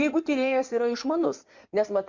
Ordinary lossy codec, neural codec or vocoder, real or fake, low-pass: MP3, 48 kbps; codec, 16 kHz in and 24 kHz out, 2.2 kbps, FireRedTTS-2 codec; fake; 7.2 kHz